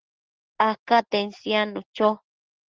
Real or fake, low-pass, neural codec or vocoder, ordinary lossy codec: real; 7.2 kHz; none; Opus, 16 kbps